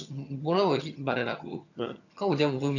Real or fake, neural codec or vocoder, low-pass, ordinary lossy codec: fake; vocoder, 22.05 kHz, 80 mel bands, HiFi-GAN; 7.2 kHz; none